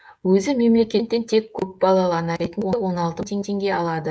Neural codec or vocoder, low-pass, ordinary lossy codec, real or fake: codec, 16 kHz, 16 kbps, FreqCodec, smaller model; none; none; fake